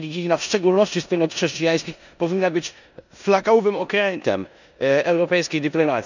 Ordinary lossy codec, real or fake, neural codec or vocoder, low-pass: none; fake; codec, 16 kHz in and 24 kHz out, 0.9 kbps, LongCat-Audio-Codec, four codebook decoder; 7.2 kHz